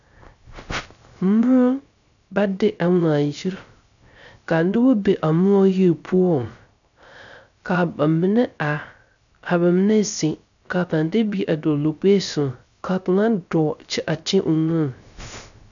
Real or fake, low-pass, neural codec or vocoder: fake; 7.2 kHz; codec, 16 kHz, 0.3 kbps, FocalCodec